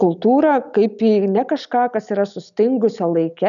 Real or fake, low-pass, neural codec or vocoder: real; 7.2 kHz; none